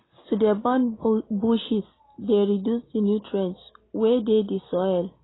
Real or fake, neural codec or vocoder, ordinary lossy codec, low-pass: real; none; AAC, 16 kbps; 7.2 kHz